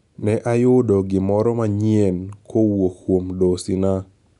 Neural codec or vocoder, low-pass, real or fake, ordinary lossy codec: none; 10.8 kHz; real; none